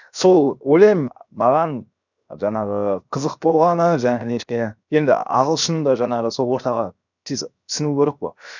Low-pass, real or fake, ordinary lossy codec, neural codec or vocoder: 7.2 kHz; fake; none; codec, 16 kHz, 0.7 kbps, FocalCodec